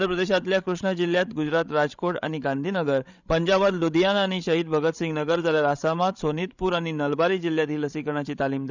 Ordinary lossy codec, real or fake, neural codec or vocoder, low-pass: none; fake; codec, 16 kHz, 16 kbps, FreqCodec, larger model; 7.2 kHz